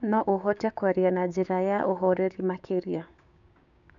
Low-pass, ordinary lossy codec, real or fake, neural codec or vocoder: 7.2 kHz; none; fake; codec, 16 kHz, 6 kbps, DAC